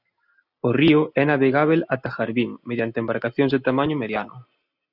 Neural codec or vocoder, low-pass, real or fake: none; 5.4 kHz; real